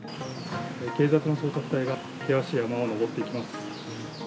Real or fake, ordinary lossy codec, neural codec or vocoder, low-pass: real; none; none; none